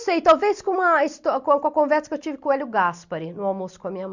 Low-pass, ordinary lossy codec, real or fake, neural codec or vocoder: 7.2 kHz; Opus, 64 kbps; real; none